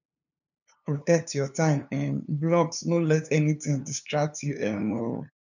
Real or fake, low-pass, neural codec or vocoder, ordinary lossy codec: fake; 7.2 kHz; codec, 16 kHz, 2 kbps, FunCodec, trained on LibriTTS, 25 frames a second; none